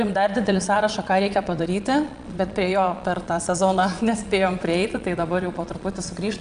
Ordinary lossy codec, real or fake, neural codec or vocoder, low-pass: MP3, 96 kbps; fake; vocoder, 22.05 kHz, 80 mel bands, Vocos; 9.9 kHz